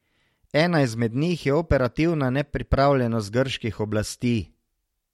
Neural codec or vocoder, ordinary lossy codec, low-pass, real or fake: none; MP3, 64 kbps; 19.8 kHz; real